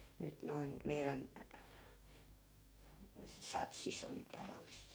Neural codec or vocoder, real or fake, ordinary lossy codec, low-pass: codec, 44.1 kHz, 2.6 kbps, DAC; fake; none; none